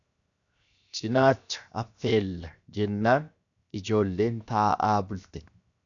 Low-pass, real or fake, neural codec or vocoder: 7.2 kHz; fake; codec, 16 kHz, 0.7 kbps, FocalCodec